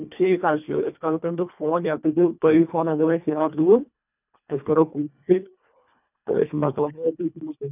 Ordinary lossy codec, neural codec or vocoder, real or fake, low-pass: none; codec, 24 kHz, 1.5 kbps, HILCodec; fake; 3.6 kHz